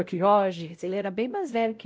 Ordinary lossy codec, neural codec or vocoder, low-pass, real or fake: none; codec, 16 kHz, 0.5 kbps, X-Codec, WavLM features, trained on Multilingual LibriSpeech; none; fake